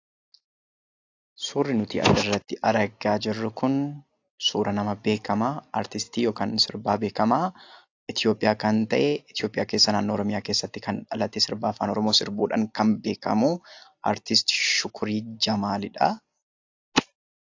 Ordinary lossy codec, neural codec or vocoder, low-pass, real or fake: AAC, 48 kbps; none; 7.2 kHz; real